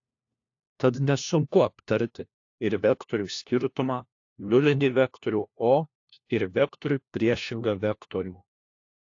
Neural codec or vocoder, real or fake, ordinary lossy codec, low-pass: codec, 16 kHz, 1 kbps, FunCodec, trained on LibriTTS, 50 frames a second; fake; AAC, 48 kbps; 7.2 kHz